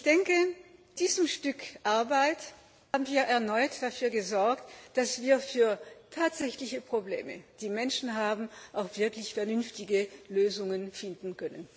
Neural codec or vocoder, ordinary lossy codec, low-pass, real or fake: none; none; none; real